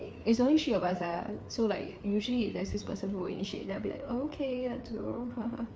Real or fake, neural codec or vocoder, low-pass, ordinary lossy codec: fake; codec, 16 kHz, 4 kbps, FreqCodec, larger model; none; none